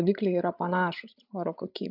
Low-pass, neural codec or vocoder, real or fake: 5.4 kHz; codec, 16 kHz, 8 kbps, FreqCodec, larger model; fake